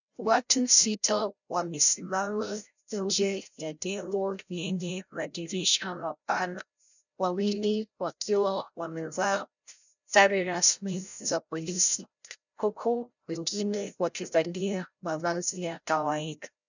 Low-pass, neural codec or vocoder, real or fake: 7.2 kHz; codec, 16 kHz, 0.5 kbps, FreqCodec, larger model; fake